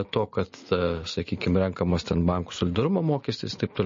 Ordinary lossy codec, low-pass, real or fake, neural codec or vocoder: MP3, 32 kbps; 7.2 kHz; real; none